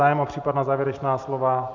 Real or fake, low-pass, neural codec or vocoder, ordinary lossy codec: real; 7.2 kHz; none; MP3, 64 kbps